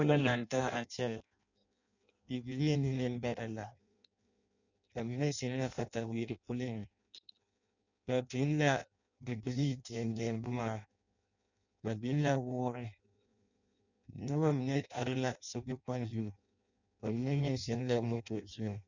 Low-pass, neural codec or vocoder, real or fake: 7.2 kHz; codec, 16 kHz in and 24 kHz out, 0.6 kbps, FireRedTTS-2 codec; fake